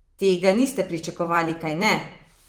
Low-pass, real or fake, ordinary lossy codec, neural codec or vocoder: 19.8 kHz; fake; Opus, 24 kbps; vocoder, 44.1 kHz, 128 mel bands, Pupu-Vocoder